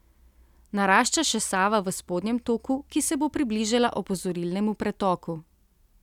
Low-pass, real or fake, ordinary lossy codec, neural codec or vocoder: 19.8 kHz; real; none; none